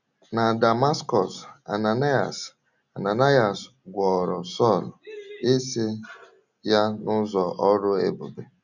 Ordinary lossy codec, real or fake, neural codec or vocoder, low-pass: none; real; none; 7.2 kHz